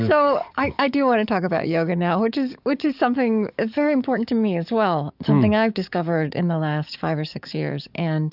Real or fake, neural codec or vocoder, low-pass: fake; codec, 44.1 kHz, 7.8 kbps, DAC; 5.4 kHz